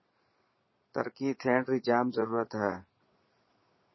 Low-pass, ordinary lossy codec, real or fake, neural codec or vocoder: 7.2 kHz; MP3, 24 kbps; fake; vocoder, 22.05 kHz, 80 mel bands, Vocos